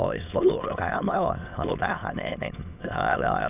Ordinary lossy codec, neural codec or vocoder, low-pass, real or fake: none; autoencoder, 22.05 kHz, a latent of 192 numbers a frame, VITS, trained on many speakers; 3.6 kHz; fake